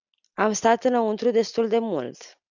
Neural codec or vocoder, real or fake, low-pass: none; real; 7.2 kHz